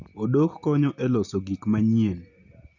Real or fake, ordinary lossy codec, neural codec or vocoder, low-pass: real; none; none; 7.2 kHz